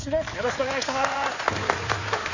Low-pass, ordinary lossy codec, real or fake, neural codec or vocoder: 7.2 kHz; none; fake; codec, 16 kHz in and 24 kHz out, 2.2 kbps, FireRedTTS-2 codec